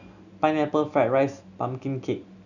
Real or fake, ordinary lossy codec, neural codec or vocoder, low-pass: real; none; none; 7.2 kHz